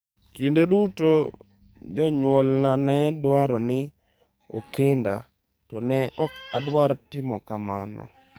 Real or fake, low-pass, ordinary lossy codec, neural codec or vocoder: fake; none; none; codec, 44.1 kHz, 2.6 kbps, SNAC